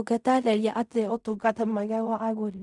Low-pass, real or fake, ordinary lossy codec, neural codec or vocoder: 10.8 kHz; fake; none; codec, 16 kHz in and 24 kHz out, 0.4 kbps, LongCat-Audio-Codec, fine tuned four codebook decoder